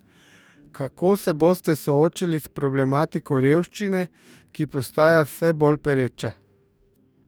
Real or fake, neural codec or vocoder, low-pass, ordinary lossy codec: fake; codec, 44.1 kHz, 2.6 kbps, DAC; none; none